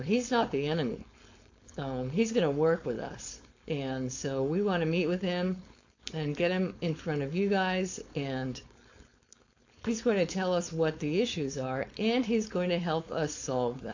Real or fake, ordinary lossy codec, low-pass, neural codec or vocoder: fake; AAC, 48 kbps; 7.2 kHz; codec, 16 kHz, 4.8 kbps, FACodec